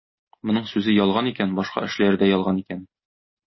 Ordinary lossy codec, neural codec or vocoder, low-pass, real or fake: MP3, 24 kbps; none; 7.2 kHz; real